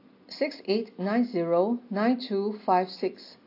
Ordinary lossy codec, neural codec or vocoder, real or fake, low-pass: AAC, 32 kbps; none; real; 5.4 kHz